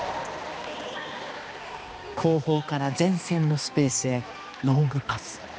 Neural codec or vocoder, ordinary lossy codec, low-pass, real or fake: codec, 16 kHz, 2 kbps, X-Codec, HuBERT features, trained on balanced general audio; none; none; fake